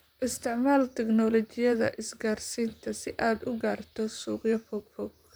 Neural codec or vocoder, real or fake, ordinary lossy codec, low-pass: vocoder, 44.1 kHz, 128 mel bands, Pupu-Vocoder; fake; none; none